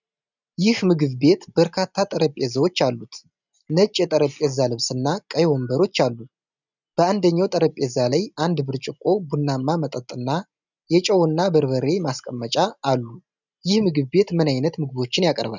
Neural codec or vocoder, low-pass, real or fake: none; 7.2 kHz; real